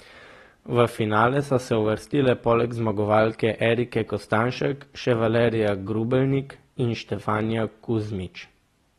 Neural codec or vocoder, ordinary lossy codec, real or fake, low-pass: none; AAC, 32 kbps; real; 14.4 kHz